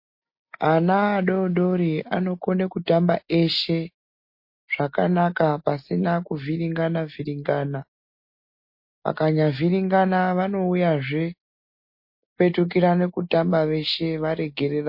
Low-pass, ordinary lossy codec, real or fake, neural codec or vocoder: 5.4 kHz; MP3, 32 kbps; real; none